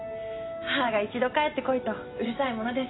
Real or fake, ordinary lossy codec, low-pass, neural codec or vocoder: real; AAC, 16 kbps; 7.2 kHz; none